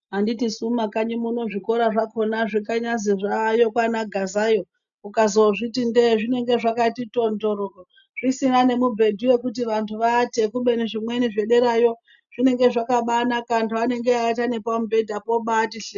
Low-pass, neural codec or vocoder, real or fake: 7.2 kHz; none; real